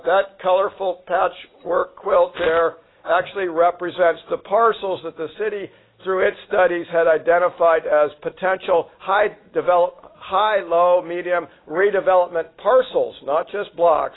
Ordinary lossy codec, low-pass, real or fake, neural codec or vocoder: AAC, 16 kbps; 7.2 kHz; real; none